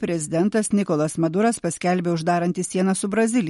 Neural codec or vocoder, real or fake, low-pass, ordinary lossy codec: none; real; 19.8 kHz; MP3, 48 kbps